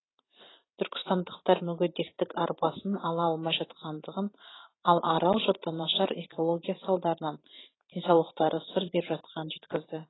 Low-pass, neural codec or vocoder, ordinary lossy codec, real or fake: 7.2 kHz; vocoder, 44.1 kHz, 128 mel bands every 512 samples, BigVGAN v2; AAC, 16 kbps; fake